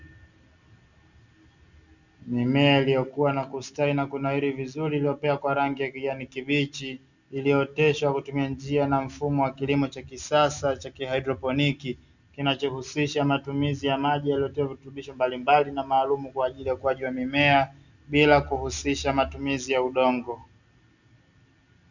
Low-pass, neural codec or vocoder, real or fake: 7.2 kHz; none; real